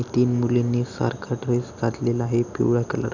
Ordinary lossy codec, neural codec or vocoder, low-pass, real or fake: none; none; 7.2 kHz; real